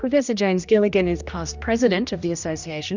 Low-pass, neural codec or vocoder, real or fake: 7.2 kHz; codec, 16 kHz, 1 kbps, X-Codec, HuBERT features, trained on general audio; fake